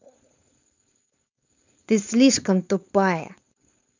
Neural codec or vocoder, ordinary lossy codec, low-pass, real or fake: codec, 16 kHz, 4.8 kbps, FACodec; none; 7.2 kHz; fake